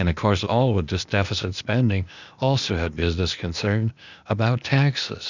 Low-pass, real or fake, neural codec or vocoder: 7.2 kHz; fake; codec, 16 kHz, 0.8 kbps, ZipCodec